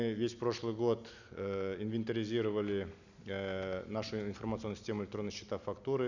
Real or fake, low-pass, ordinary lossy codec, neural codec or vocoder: real; 7.2 kHz; none; none